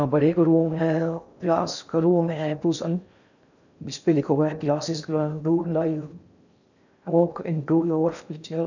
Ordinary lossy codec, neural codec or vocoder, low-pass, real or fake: none; codec, 16 kHz in and 24 kHz out, 0.6 kbps, FocalCodec, streaming, 4096 codes; 7.2 kHz; fake